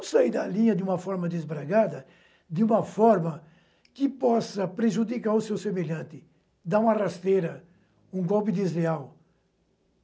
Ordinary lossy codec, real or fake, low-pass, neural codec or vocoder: none; real; none; none